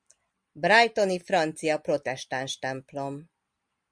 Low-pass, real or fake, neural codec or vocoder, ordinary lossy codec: 9.9 kHz; real; none; Opus, 64 kbps